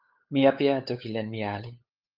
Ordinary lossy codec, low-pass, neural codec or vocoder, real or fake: Opus, 24 kbps; 5.4 kHz; codec, 16 kHz, 8 kbps, FunCodec, trained on LibriTTS, 25 frames a second; fake